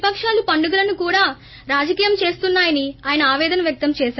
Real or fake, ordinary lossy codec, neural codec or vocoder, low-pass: real; MP3, 24 kbps; none; 7.2 kHz